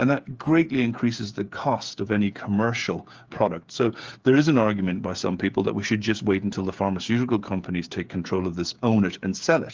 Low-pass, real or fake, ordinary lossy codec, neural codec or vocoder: 7.2 kHz; fake; Opus, 24 kbps; codec, 16 kHz, 8 kbps, FreqCodec, smaller model